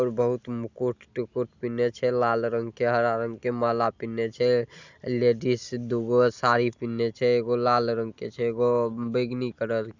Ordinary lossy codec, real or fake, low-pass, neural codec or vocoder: Opus, 64 kbps; real; 7.2 kHz; none